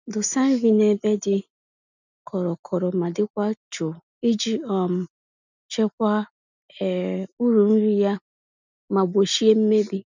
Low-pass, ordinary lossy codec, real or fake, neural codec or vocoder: 7.2 kHz; none; real; none